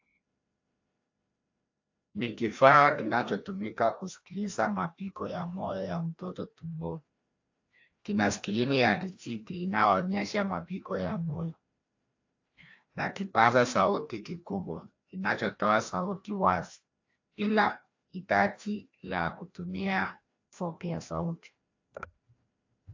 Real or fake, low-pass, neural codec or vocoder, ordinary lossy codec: fake; 7.2 kHz; codec, 16 kHz, 1 kbps, FreqCodec, larger model; AAC, 48 kbps